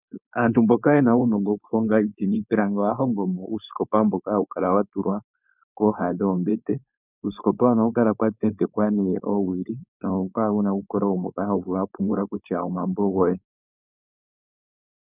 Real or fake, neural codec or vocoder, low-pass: fake; codec, 16 kHz, 4.8 kbps, FACodec; 3.6 kHz